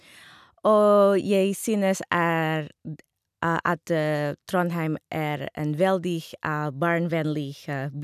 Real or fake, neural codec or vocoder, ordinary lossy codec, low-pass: real; none; none; 14.4 kHz